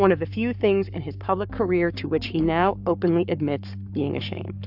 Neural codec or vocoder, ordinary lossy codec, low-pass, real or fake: codec, 44.1 kHz, 7.8 kbps, Pupu-Codec; AAC, 48 kbps; 5.4 kHz; fake